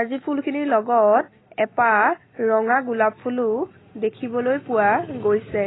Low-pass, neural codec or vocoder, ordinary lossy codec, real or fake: 7.2 kHz; none; AAC, 16 kbps; real